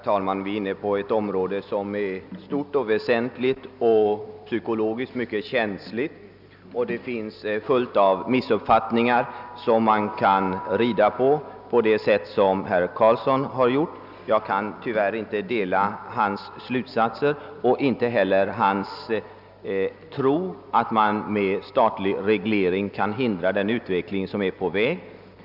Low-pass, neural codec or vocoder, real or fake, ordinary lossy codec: 5.4 kHz; none; real; none